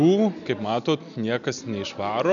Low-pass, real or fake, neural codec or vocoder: 7.2 kHz; real; none